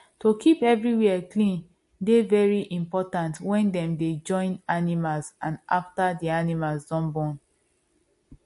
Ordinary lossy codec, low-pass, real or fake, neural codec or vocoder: MP3, 48 kbps; 14.4 kHz; real; none